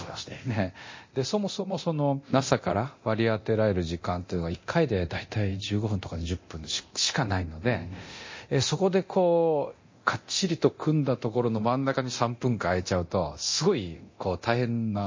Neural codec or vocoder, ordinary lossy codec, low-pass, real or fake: codec, 24 kHz, 0.9 kbps, DualCodec; MP3, 32 kbps; 7.2 kHz; fake